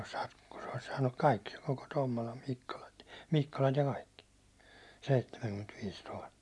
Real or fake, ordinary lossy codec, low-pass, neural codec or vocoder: real; none; none; none